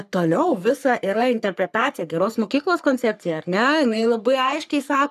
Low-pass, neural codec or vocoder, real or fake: 14.4 kHz; codec, 44.1 kHz, 3.4 kbps, Pupu-Codec; fake